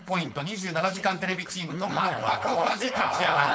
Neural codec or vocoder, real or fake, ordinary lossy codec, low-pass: codec, 16 kHz, 4.8 kbps, FACodec; fake; none; none